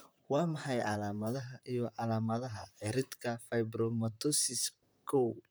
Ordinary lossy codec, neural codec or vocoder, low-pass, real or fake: none; vocoder, 44.1 kHz, 128 mel bands, Pupu-Vocoder; none; fake